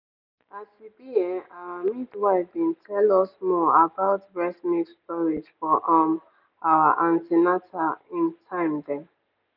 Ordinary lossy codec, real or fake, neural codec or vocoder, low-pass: none; real; none; 5.4 kHz